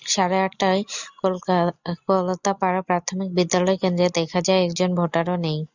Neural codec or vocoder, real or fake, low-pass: none; real; 7.2 kHz